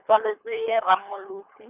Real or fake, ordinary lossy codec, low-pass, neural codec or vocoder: fake; none; 3.6 kHz; codec, 24 kHz, 3 kbps, HILCodec